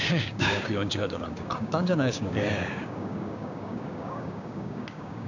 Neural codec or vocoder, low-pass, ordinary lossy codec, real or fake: codec, 16 kHz, 6 kbps, DAC; 7.2 kHz; none; fake